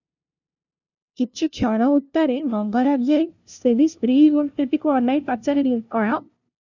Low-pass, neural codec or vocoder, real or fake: 7.2 kHz; codec, 16 kHz, 0.5 kbps, FunCodec, trained on LibriTTS, 25 frames a second; fake